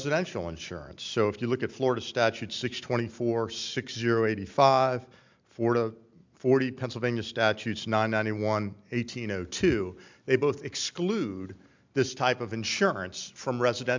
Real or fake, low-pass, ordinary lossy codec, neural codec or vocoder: real; 7.2 kHz; MP3, 64 kbps; none